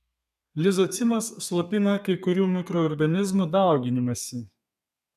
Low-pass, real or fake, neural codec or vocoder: 14.4 kHz; fake; codec, 32 kHz, 1.9 kbps, SNAC